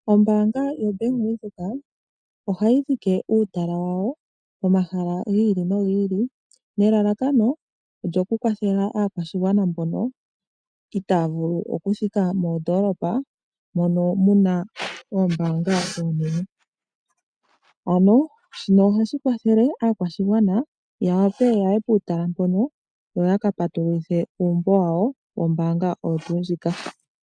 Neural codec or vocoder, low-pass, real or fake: none; 9.9 kHz; real